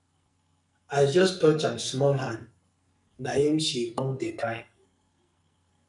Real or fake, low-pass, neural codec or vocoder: fake; 10.8 kHz; codec, 44.1 kHz, 2.6 kbps, SNAC